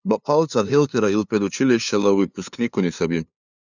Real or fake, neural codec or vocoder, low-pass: fake; codec, 16 kHz, 2 kbps, FunCodec, trained on LibriTTS, 25 frames a second; 7.2 kHz